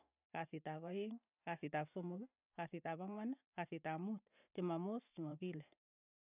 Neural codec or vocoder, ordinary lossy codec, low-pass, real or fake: vocoder, 44.1 kHz, 128 mel bands every 512 samples, BigVGAN v2; none; 3.6 kHz; fake